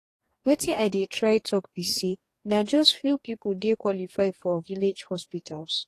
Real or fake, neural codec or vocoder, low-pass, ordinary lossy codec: fake; codec, 44.1 kHz, 2.6 kbps, DAC; 14.4 kHz; AAC, 48 kbps